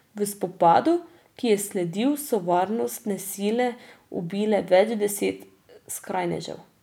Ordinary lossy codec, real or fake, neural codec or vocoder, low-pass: none; real; none; 19.8 kHz